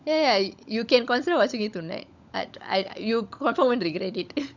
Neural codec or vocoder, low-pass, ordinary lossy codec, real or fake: codec, 16 kHz, 16 kbps, FunCodec, trained on LibriTTS, 50 frames a second; 7.2 kHz; none; fake